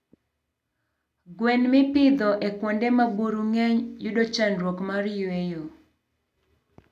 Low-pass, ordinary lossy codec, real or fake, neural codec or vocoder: 14.4 kHz; none; real; none